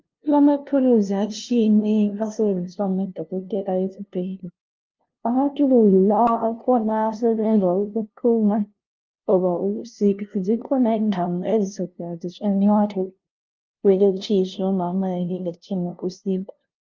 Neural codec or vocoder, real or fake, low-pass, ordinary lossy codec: codec, 16 kHz, 0.5 kbps, FunCodec, trained on LibriTTS, 25 frames a second; fake; 7.2 kHz; Opus, 24 kbps